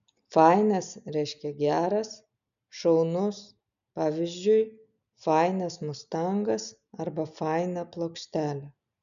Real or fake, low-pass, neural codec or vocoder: real; 7.2 kHz; none